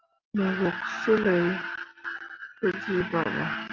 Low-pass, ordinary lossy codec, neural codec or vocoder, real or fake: 7.2 kHz; Opus, 24 kbps; none; real